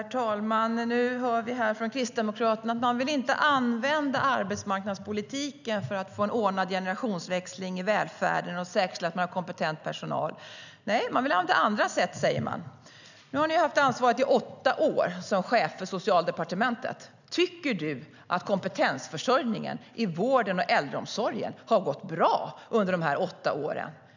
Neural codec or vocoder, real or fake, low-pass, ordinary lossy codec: none; real; 7.2 kHz; none